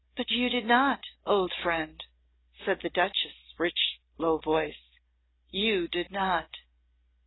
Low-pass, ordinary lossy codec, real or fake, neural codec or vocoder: 7.2 kHz; AAC, 16 kbps; real; none